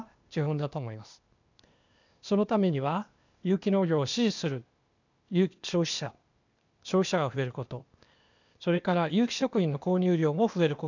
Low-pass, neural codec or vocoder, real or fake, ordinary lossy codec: 7.2 kHz; codec, 16 kHz, 0.8 kbps, ZipCodec; fake; none